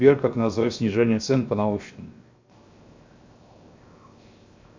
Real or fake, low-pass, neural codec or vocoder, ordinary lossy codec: fake; 7.2 kHz; codec, 16 kHz, 0.7 kbps, FocalCodec; MP3, 64 kbps